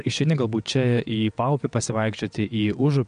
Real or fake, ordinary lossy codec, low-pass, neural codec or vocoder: fake; MP3, 64 kbps; 9.9 kHz; vocoder, 22.05 kHz, 80 mel bands, WaveNeXt